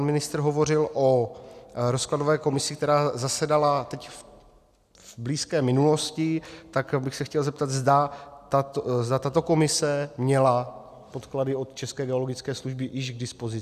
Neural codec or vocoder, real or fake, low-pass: none; real; 14.4 kHz